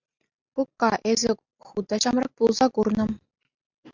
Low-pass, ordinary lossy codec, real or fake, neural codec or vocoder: 7.2 kHz; MP3, 64 kbps; real; none